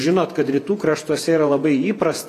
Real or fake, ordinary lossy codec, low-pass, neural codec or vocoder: fake; AAC, 64 kbps; 14.4 kHz; vocoder, 48 kHz, 128 mel bands, Vocos